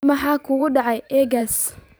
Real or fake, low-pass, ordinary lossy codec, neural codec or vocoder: fake; none; none; vocoder, 44.1 kHz, 128 mel bands every 512 samples, BigVGAN v2